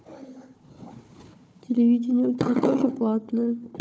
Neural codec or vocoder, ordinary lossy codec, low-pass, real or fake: codec, 16 kHz, 4 kbps, FunCodec, trained on Chinese and English, 50 frames a second; none; none; fake